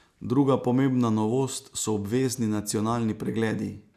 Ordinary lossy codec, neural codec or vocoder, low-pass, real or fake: none; none; 14.4 kHz; real